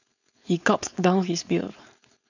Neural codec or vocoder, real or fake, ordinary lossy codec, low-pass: codec, 16 kHz, 4.8 kbps, FACodec; fake; none; 7.2 kHz